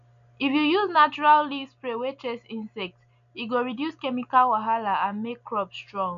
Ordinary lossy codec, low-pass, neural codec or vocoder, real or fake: none; 7.2 kHz; none; real